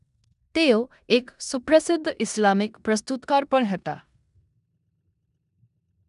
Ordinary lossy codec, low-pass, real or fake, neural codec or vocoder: none; 10.8 kHz; fake; codec, 16 kHz in and 24 kHz out, 0.9 kbps, LongCat-Audio-Codec, four codebook decoder